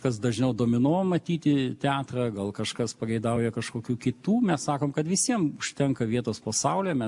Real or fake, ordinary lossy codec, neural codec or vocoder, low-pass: real; MP3, 64 kbps; none; 10.8 kHz